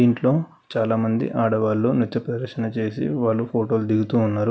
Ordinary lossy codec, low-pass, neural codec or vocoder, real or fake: none; none; none; real